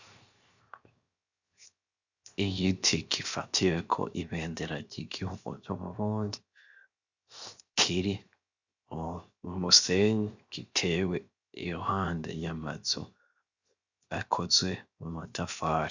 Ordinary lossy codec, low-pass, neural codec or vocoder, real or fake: Opus, 64 kbps; 7.2 kHz; codec, 16 kHz, 0.7 kbps, FocalCodec; fake